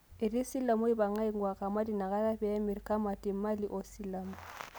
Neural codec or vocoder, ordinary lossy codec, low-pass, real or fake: none; none; none; real